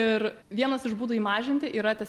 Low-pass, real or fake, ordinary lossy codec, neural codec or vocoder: 14.4 kHz; real; Opus, 24 kbps; none